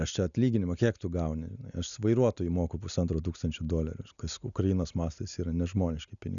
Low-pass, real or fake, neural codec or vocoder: 7.2 kHz; real; none